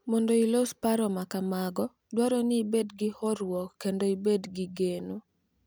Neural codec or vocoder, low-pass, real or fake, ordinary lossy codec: none; none; real; none